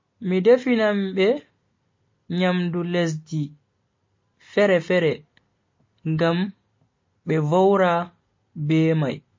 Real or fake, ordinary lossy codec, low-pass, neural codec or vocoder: real; MP3, 32 kbps; 7.2 kHz; none